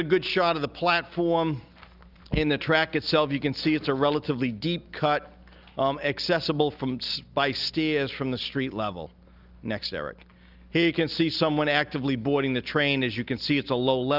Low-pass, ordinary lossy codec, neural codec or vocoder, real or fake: 5.4 kHz; Opus, 24 kbps; none; real